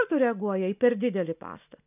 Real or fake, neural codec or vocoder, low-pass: real; none; 3.6 kHz